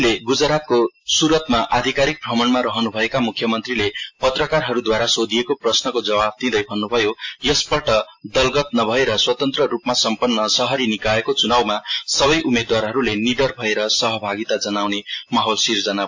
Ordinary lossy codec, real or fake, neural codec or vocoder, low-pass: AAC, 48 kbps; real; none; 7.2 kHz